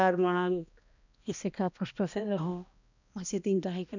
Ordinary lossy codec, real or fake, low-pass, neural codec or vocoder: none; fake; 7.2 kHz; codec, 16 kHz, 1 kbps, X-Codec, HuBERT features, trained on balanced general audio